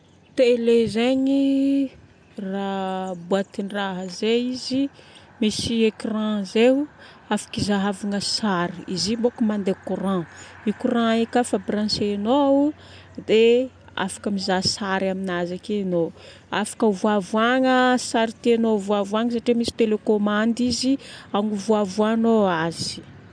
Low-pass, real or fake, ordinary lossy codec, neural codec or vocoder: 9.9 kHz; real; none; none